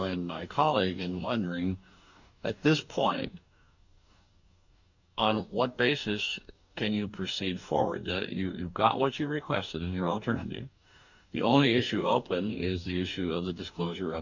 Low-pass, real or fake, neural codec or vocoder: 7.2 kHz; fake; codec, 44.1 kHz, 2.6 kbps, DAC